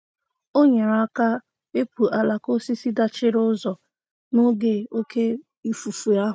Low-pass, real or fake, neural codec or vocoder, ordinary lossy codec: none; real; none; none